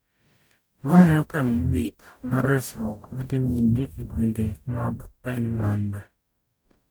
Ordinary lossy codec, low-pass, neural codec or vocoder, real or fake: none; none; codec, 44.1 kHz, 0.9 kbps, DAC; fake